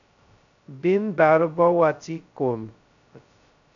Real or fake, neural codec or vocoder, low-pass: fake; codec, 16 kHz, 0.2 kbps, FocalCodec; 7.2 kHz